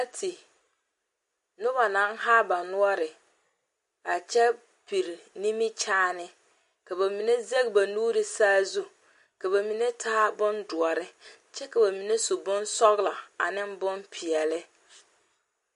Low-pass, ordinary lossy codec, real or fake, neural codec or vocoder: 10.8 kHz; MP3, 48 kbps; real; none